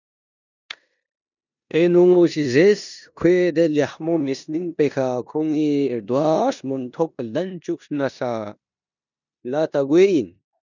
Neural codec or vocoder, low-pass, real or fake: codec, 16 kHz in and 24 kHz out, 0.9 kbps, LongCat-Audio-Codec, four codebook decoder; 7.2 kHz; fake